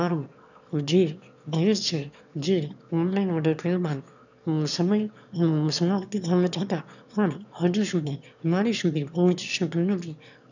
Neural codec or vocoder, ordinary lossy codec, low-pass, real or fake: autoencoder, 22.05 kHz, a latent of 192 numbers a frame, VITS, trained on one speaker; none; 7.2 kHz; fake